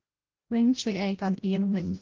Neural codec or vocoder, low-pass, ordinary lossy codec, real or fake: codec, 16 kHz, 0.5 kbps, FreqCodec, larger model; 7.2 kHz; Opus, 16 kbps; fake